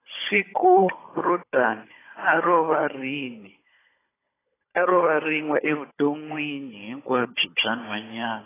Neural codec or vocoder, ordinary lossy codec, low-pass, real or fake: codec, 16 kHz, 16 kbps, FunCodec, trained on Chinese and English, 50 frames a second; AAC, 16 kbps; 3.6 kHz; fake